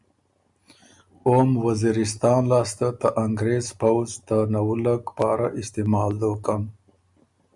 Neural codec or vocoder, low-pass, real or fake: none; 10.8 kHz; real